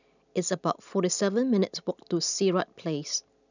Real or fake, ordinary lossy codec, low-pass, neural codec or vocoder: real; none; 7.2 kHz; none